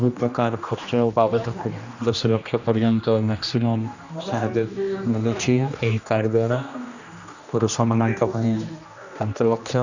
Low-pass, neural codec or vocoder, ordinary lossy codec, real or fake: 7.2 kHz; codec, 16 kHz, 1 kbps, X-Codec, HuBERT features, trained on general audio; none; fake